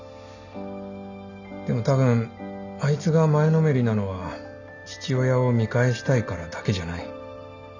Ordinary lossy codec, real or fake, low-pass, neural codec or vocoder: none; real; 7.2 kHz; none